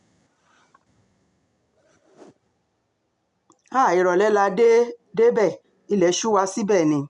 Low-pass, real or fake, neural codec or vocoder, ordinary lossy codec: 10.8 kHz; real; none; none